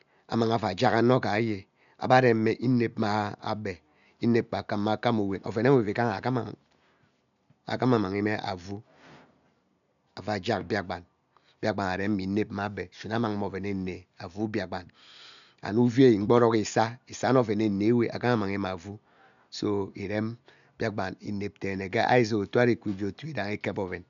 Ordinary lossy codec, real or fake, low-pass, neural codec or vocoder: none; real; 7.2 kHz; none